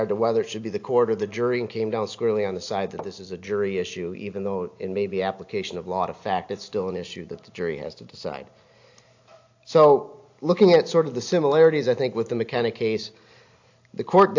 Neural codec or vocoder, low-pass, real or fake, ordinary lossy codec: none; 7.2 kHz; real; AAC, 48 kbps